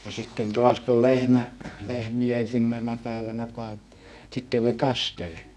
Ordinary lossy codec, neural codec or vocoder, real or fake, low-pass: none; codec, 24 kHz, 0.9 kbps, WavTokenizer, medium music audio release; fake; none